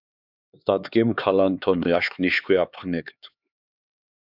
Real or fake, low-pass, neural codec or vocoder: fake; 5.4 kHz; codec, 16 kHz, 4 kbps, X-Codec, HuBERT features, trained on LibriSpeech